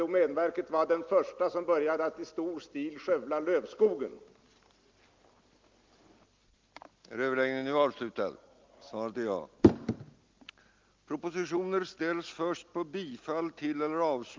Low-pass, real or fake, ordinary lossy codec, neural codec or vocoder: 7.2 kHz; real; Opus, 32 kbps; none